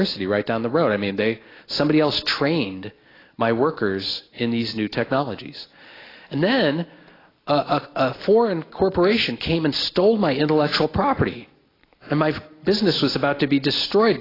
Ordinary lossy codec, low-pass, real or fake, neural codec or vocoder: AAC, 24 kbps; 5.4 kHz; real; none